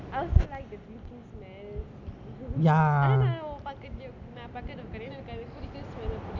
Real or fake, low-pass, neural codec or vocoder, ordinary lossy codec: real; 7.2 kHz; none; none